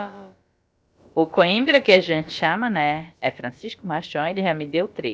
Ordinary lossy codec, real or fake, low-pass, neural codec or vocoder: none; fake; none; codec, 16 kHz, about 1 kbps, DyCAST, with the encoder's durations